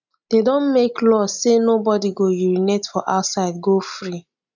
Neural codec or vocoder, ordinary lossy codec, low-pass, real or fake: none; none; 7.2 kHz; real